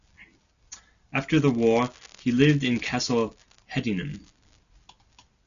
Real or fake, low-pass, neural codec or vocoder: real; 7.2 kHz; none